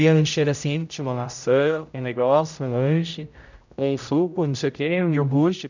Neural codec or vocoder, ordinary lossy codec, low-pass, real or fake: codec, 16 kHz, 0.5 kbps, X-Codec, HuBERT features, trained on general audio; none; 7.2 kHz; fake